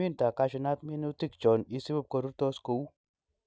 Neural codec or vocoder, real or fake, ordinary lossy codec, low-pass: none; real; none; none